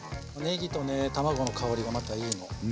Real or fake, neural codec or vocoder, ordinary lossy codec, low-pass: real; none; none; none